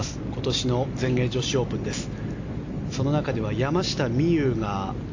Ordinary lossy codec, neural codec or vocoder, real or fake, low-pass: AAC, 48 kbps; none; real; 7.2 kHz